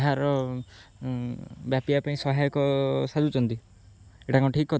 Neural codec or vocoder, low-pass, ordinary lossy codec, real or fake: none; none; none; real